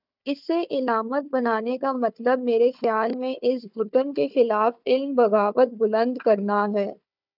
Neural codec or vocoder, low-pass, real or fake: codec, 16 kHz, 4 kbps, FunCodec, trained on Chinese and English, 50 frames a second; 5.4 kHz; fake